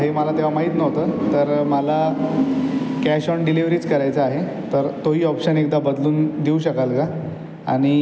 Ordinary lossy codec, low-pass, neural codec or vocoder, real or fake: none; none; none; real